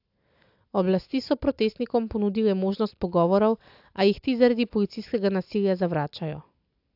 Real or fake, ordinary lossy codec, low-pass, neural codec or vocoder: real; AAC, 48 kbps; 5.4 kHz; none